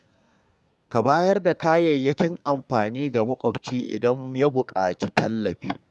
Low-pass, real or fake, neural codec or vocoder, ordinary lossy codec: none; fake; codec, 24 kHz, 1 kbps, SNAC; none